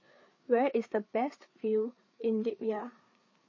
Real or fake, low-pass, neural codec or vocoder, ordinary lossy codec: fake; 7.2 kHz; codec, 16 kHz, 4 kbps, FreqCodec, larger model; MP3, 32 kbps